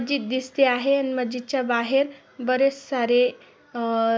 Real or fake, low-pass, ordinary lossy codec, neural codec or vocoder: real; none; none; none